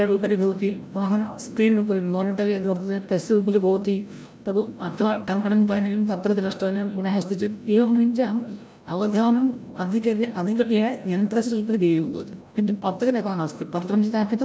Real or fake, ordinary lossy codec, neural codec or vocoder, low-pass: fake; none; codec, 16 kHz, 0.5 kbps, FreqCodec, larger model; none